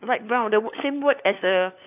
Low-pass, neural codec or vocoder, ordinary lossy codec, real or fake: 3.6 kHz; codec, 16 kHz, 4 kbps, FunCodec, trained on LibriTTS, 50 frames a second; AAC, 32 kbps; fake